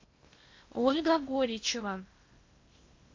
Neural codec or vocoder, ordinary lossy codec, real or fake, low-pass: codec, 16 kHz in and 24 kHz out, 0.8 kbps, FocalCodec, streaming, 65536 codes; MP3, 48 kbps; fake; 7.2 kHz